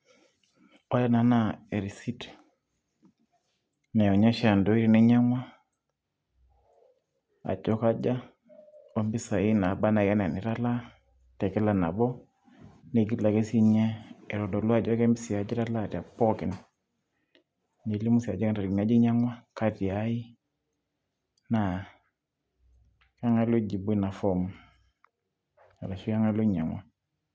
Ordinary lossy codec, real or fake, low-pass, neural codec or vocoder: none; real; none; none